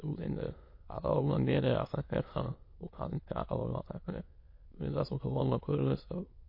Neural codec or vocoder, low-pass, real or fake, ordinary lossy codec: autoencoder, 22.05 kHz, a latent of 192 numbers a frame, VITS, trained on many speakers; 5.4 kHz; fake; MP3, 32 kbps